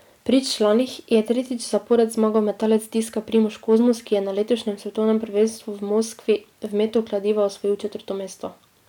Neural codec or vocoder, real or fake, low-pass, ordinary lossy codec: none; real; 19.8 kHz; none